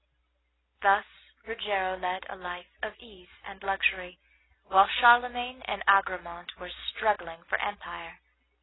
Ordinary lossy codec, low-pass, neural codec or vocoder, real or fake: AAC, 16 kbps; 7.2 kHz; none; real